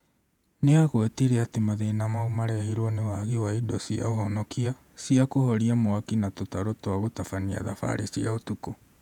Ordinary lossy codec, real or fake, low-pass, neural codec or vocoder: none; fake; 19.8 kHz; vocoder, 48 kHz, 128 mel bands, Vocos